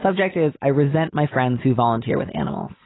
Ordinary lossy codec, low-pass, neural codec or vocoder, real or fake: AAC, 16 kbps; 7.2 kHz; none; real